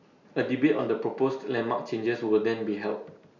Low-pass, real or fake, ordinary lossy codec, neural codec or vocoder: 7.2 kHz; real; none; none